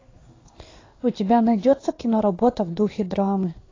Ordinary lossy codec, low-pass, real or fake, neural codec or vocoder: AAC, 32 kbps; 7.2 kHz; fake; codec, 16 kHz in and 24 kHz out, 1 kbps, XY-Tokenizer